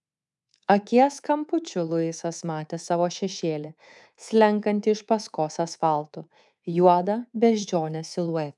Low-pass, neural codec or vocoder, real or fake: 10.8 kHz; codec, 24 kHz, 3.1 kbps, DualCodec; fake